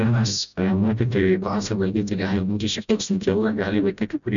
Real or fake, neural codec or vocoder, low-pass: fake; codec, 16 kHz, 0.5 kbps, FreqCodec, smaller model; 7.2 kHz